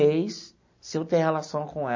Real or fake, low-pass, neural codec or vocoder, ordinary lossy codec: real; 7.2 kHz; none; MP3, 32 kbps